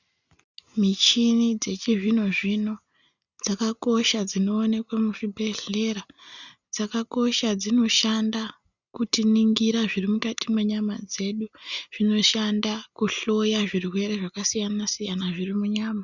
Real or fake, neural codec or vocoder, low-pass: real; none; 7.2 kHz